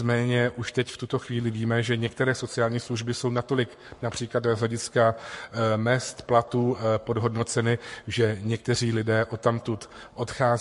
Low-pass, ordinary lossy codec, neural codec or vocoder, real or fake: 14.4 kHz; MP3, 48 kbps; codec, 44.1 kHz, 7.8 kbps, Pupu-Codec; fake